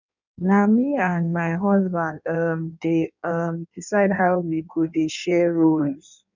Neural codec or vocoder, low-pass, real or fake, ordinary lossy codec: codec, 16 kHz in and 24 kHz out, 1.1 kbps, FireRedTTS-2 codec; 7.2 kHz; fake; none